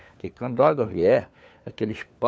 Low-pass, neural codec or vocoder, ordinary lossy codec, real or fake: none; codec, 16 kHz, 4 kbps, FunCodec, trained on LibriTTS, 50 frames a second; none; fake